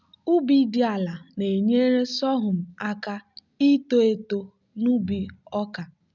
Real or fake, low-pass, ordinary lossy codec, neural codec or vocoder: real; 7.2 kHz; none; none